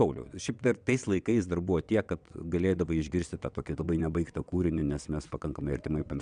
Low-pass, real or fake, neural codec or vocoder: 9.9 kHz; fake; vocoder, 22.05 kHz, 80 mel bands, WaveNeXt